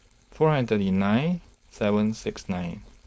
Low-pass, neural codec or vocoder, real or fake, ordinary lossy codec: none; codec, 16 kHz, 4.8 kbps, FACodec; fake; none